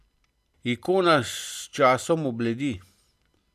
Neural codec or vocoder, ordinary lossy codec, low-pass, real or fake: none; none; 14.4 kHz; real